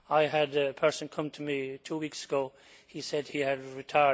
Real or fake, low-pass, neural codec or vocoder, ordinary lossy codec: real; none; none; none